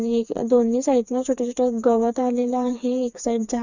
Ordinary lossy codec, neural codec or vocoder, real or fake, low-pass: none; codec, 16 kHz, 4 kbps, FreqCodec, smaller model; fake; 7.2 kHz